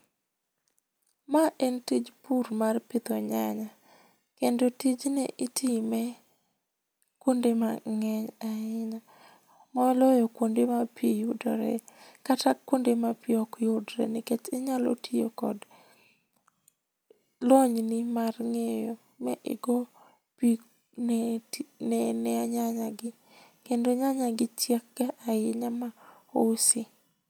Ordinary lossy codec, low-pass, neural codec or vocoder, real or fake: none; none; none; real